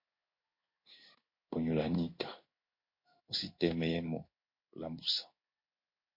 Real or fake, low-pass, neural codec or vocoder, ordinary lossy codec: fake; 5.4 kHz; codec, 16 kHz in and 24 kHz out, 1 kbps, XY-Tokenizer; MP3, 32 kbps